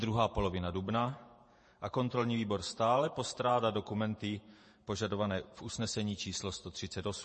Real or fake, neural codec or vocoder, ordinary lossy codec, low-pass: fake; vocoder, 48 kHz, 128 mel bands, Vocos; MP3, 32 kbps; 10.8 kHz